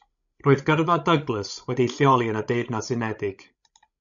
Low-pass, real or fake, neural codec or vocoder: 7.2 kHz; fake; codec, 16 kHz, 16 kbps, FreqCodec, larger model